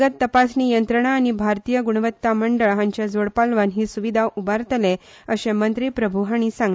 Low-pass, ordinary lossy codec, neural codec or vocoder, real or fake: none; none; none; real